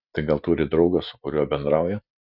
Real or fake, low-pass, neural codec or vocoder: fake; 5.4 kHz; vocoder, 44.1 kHz, 128 mel bands every 256 samples, BigVGAN v2